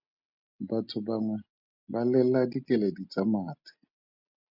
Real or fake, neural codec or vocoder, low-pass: real; none; 5.4 kHz